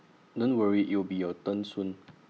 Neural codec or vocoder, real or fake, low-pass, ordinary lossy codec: none; real; none; none